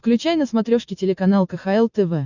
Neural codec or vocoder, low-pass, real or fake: none; 7.2 kHz; real